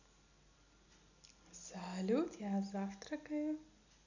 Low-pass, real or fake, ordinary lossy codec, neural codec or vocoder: 7.2 kHz; real; none; none